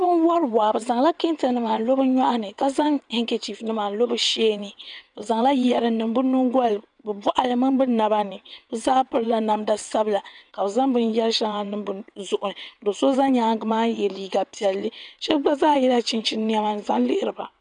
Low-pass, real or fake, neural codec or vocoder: 9.9 kHz; fake; vocoder, 22.05 kHz, 80 mel bands, WaveNeXt